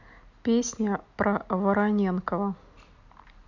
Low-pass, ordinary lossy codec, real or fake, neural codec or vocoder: 7.2 kHz; none; real; none